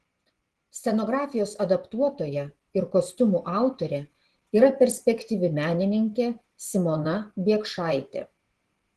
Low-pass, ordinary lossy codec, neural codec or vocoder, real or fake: 10.8 kHz; Opus, 16 kbps; vocoder, 24 kHz, 100 mel bands, Vocos; fake